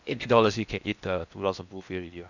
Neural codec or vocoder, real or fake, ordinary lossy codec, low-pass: codec, 16 kHz in and 24 kHz out, 0.8 kbps, FocalCodec, streaming, 65536 codes; fake; none; 7.2 kHz